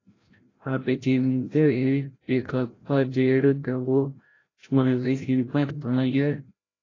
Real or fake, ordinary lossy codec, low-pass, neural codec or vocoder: fake; AAC, 32 kbps; 7.2 kHz; codec, 16 kHz, 0.5 kbps, FreqCodec, larger model